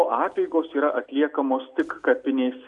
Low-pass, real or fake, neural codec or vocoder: 10.8 kHz; real; none